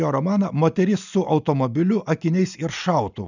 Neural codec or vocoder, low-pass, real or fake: none; 7.2 kHz; real